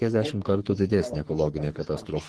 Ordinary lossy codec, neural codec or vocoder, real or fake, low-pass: Opus, 16 kbps; codec, 44.1 kHz, 3.4 kbps, Pupu-Codec; fake; 10.8 kHz